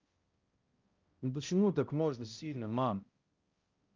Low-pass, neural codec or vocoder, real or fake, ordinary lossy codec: 7.2 kHz; codec, 16 kHz, 0.5 kbps, X-Codec, HuBERT features, trained on balanced general audio; fake; Opus, 24 kbps